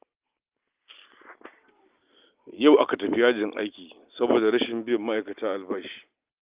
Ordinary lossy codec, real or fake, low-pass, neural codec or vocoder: Opus, 32 kbps; real; 3.6 kHz; none